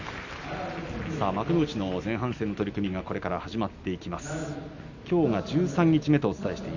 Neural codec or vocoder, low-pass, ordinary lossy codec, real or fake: none; 7.2 kHz; none; real